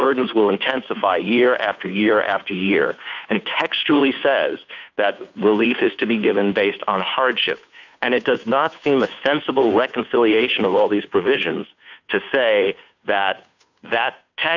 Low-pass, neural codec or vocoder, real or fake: 7.2 kHz; codec, 16 kHz, 2 kbps, FunCodec, trained on Chinese and English, 25 frames a second; fake